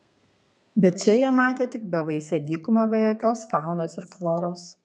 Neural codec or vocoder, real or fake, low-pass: codec, 32 kHz, 1.9 kbps, SNAC; fake; 10.8 kHz